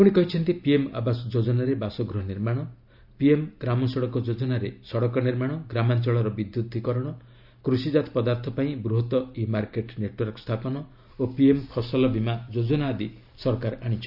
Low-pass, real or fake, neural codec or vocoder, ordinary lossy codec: 5.4 kHz; real; none; none